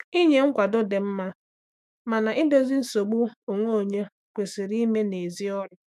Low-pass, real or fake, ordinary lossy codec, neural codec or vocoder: 14.4 kHz; fake; none; autoencoder, 48 kHz, 128 numbers a frame, DAC-VAE, trained on Japanese speech